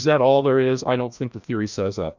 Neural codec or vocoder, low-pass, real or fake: codec, 16 kHz, 1 kbps, FreqCodec, larger model; 7.2 kHz; fake